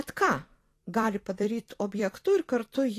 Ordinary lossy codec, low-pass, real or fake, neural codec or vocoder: AAC, 48 kbps; 14.4 kHz; fake; vocoder, 44.1 kHz, 128 mel bands every 256 samples, BigVGAN v2